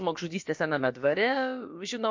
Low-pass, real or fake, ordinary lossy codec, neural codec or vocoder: 7.2 kHz; fake; MP3, 48 kbps; codec, 16 kHz, about 1 kbps, DyCAST, with the encoder's durations